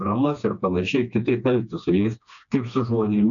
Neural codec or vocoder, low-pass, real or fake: codec, 16 kHz, 2 kbps, FreqCodec, smaller model; 7.2 kHz; fake